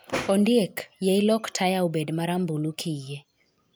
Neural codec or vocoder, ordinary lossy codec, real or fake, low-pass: none; none; real; none